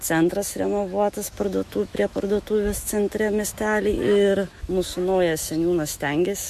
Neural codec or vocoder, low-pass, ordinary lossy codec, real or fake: autoencoder, 48 kHz, 128 numbers a frame, DAC-VAE, trained on Japanese speech; 14.4 kHz; MP3, 64 kbps; fake